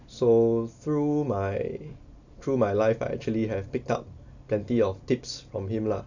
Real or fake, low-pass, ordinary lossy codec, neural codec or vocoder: real; 7.2 kHz; none; none